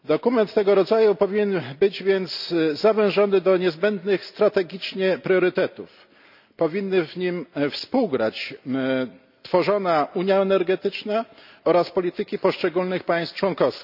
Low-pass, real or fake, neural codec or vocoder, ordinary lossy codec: 5.4 kHz; real; none; none